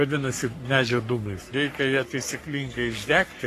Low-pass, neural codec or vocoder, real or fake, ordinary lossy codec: 14.4 kHz; codec, 44.1 kHz, 3.4 kbps, Pupu-Codec; fake; AAC, 48 kbps